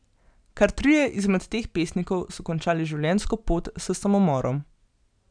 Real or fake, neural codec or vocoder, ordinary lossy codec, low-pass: real; none; none; 9.9 kHz